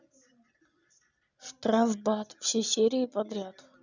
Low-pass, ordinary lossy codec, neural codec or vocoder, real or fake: 7.2 kHz; none; none; real